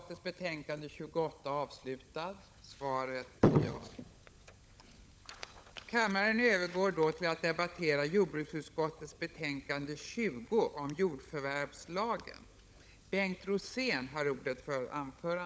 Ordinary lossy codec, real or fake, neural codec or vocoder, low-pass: none; fake; codec, 16 kHz, 16 kbps, FunCodec, trained on LibriTTS, 50 frames a second; none